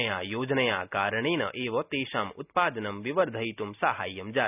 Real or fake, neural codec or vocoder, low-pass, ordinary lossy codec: real; none; 3.6 kHz; none